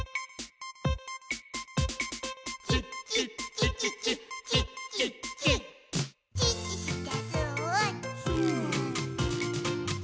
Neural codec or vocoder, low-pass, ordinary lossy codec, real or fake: none; none; none; real